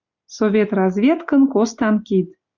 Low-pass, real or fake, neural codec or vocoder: 7.2 kHz; real; none